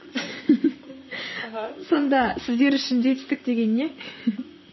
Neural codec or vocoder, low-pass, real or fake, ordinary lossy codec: vocoder, 44.1 kHz, 128 mel bands, Pupu-Vocoder; 7.2 kHz; fake; MP3, 24 kbps